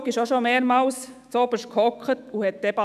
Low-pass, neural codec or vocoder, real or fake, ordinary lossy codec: 14.4 kHz; autoencoder, 48 kHz, 128 numbers a frame, DAC-VAE, trained on Japanese speech; fake; none